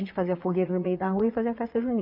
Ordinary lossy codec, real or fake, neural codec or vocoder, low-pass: none; fake; vocoder, 22.05 kHz, 80 mel bands, Vocos; 5.4 kHz